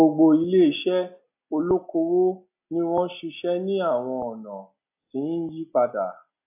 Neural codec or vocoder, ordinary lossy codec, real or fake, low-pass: none; AAC, 32 kbps; real; 3.6 kHz